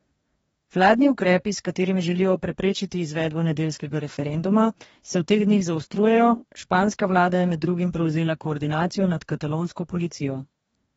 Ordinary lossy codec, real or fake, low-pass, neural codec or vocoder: AAC, 24 kbps; fake; 19.8 kHz; codec, 44.1 kHz, 2.6 kbps, DAC